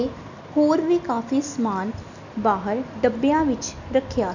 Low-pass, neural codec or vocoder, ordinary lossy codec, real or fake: 7.2 kHz; none; none; real